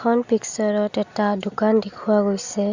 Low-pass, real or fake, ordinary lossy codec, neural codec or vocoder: 7.2 kHz; real; Opus, 64 kbps; none